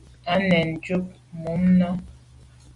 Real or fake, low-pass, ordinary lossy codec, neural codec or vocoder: real; 10.8 kHz; Opus, 64 kbps; none